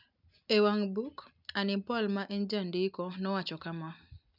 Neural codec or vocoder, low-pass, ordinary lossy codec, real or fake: none; 5.4 kHz; none; real